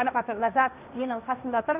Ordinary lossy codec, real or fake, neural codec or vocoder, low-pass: none; fake; codec, 16 kHz, 1.1 kbps, Voila-Tokenizer; 3.6 kHz